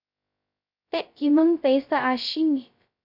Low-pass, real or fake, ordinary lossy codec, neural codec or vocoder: 5.4 kHz; fake; MP3, 48 kbps; codec, 16 kHz, 0.2 kbps, FocalCodec